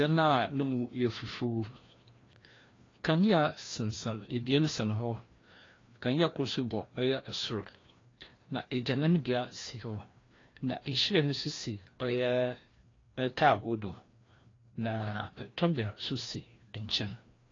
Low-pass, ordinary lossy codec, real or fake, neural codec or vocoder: 7.2 kHz; AAC, 32 kbps; fake; codec, 16 kHz, 1 kbps, FreqCodec, larger model